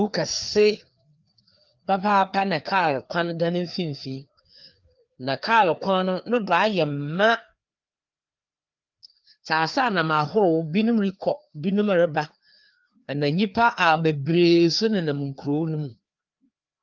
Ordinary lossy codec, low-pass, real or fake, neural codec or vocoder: Opus, 32 kbps; 7.2 kHz; fake; codec, 16 kHz, 2 kbps, FreqCodec, larger model